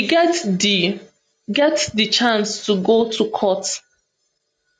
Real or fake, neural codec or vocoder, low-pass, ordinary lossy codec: real; none; 9.9 kHz; none